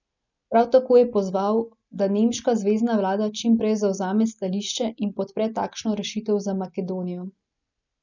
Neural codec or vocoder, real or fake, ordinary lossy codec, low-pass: none; real; none; 7.2 kHz